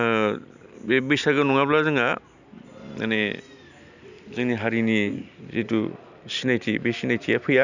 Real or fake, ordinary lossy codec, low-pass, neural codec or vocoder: real; none; 7.2 kHz; none